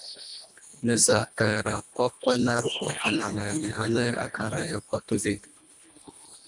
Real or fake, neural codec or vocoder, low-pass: fake; codec, 24 kHz, 1.5 kbps, HILCodec; 10.8 kHz